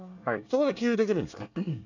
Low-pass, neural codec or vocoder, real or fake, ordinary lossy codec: 7.2 kHz; codec, 24 kHz, 1 kbps, SNAC; fake; none